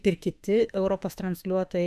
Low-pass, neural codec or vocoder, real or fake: 14.4 kHz; autoencoder, 48 kHz, 32 numbers a frame, DAC-VAE, trained on Japanese speech; fake